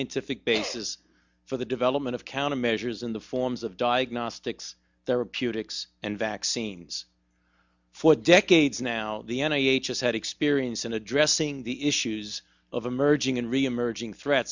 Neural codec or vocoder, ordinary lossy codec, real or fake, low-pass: none; Opus, 64 kbps; real; 7.2 kHz